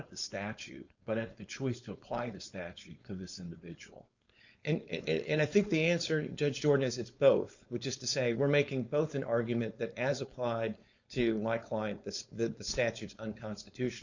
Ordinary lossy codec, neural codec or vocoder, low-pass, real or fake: Opus, 64 kbps; codec, 16 kHz, 4.8 kbps, FACodec; 7.2 kHz; fake